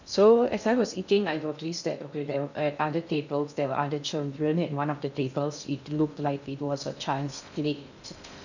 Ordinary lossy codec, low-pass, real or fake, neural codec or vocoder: none; 7.2 kHz; fake; codec, 16 kHz in and 24 kHz out, 0.6 kbps, FocalCodec, streaming, 2048 codes